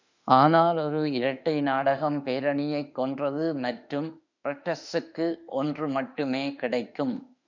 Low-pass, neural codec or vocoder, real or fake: 7.2 kHz; autoencoder, 48 kHz, 32 numbers a frame, DAC-VAE, trained on Japanese speech; fake